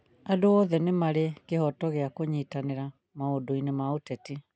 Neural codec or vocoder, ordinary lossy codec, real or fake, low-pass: none; none; real; none